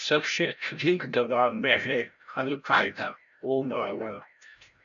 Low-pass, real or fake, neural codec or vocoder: 7.2 kHz; fake; codec, 16 kHz, 0.5 kbps, FreqCodec, larger model